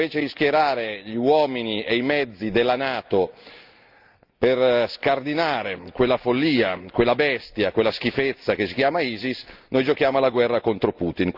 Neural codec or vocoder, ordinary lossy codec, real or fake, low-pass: none; Opus, 24 kbps; real; 5.4 kHz